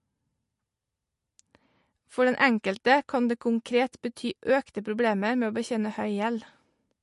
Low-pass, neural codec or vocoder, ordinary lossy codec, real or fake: 14.4 kHz; none; MP3, 48 kbps; real